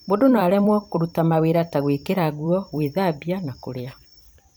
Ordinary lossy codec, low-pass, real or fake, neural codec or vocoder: none; none; fake; vocoder, 44.1 kHz, 128 mel bands every 256 samples, BigVGAN v2